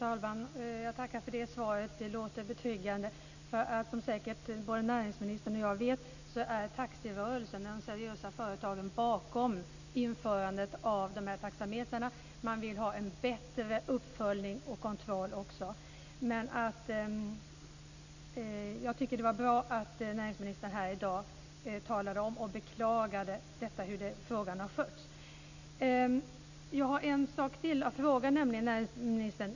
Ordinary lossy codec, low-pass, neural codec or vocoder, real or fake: none; 7.2 kHz; none; real